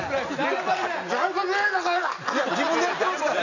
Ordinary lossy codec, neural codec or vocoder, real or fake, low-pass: none; none; real; 7.2 kHz